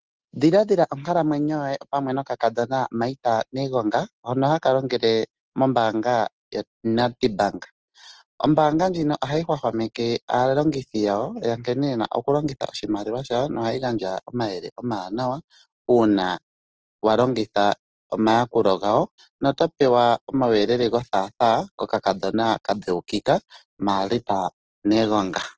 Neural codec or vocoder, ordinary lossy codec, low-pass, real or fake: none; Opus, 16 kbps; 7.2 kHz; real